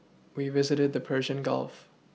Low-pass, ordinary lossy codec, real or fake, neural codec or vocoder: none; none; real; none